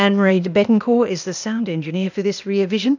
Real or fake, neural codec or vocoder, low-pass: fake; codec, 16 kHz, 0.8 kbps, ZipCodec; 7.2 kHz